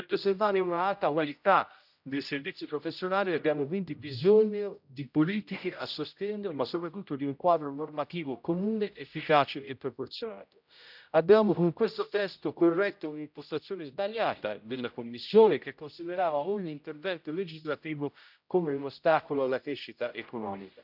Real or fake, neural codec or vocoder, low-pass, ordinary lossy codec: fake; codec, 16 kHz, 0.5 kbps, X-Codec, HuBERT features, trained on general audio; 5.4 kHz; none